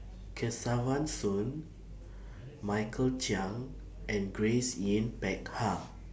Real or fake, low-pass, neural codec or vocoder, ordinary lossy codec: real; none; none; none